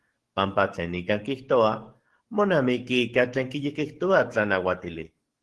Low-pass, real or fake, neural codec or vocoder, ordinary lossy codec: 10.8 kHz; fake; codec, 44.1 kHz, 7.8 kbps, DAC; Opus, 16 kbps